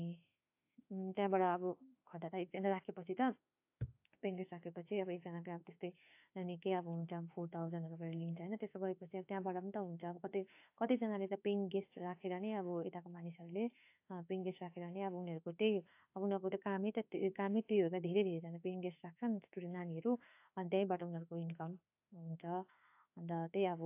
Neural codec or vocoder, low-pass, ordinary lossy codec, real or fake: autoencoder, 48 kHz, 32 numbers a frame, DAC-VAE, trained on Japanese speech; 3.6 kHz; AAC, 32 kbps; fake